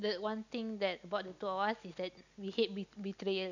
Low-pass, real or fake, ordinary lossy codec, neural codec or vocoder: 7.2 kHz; real; none; none